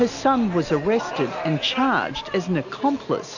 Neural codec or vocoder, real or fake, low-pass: none; real; 7.2 kHz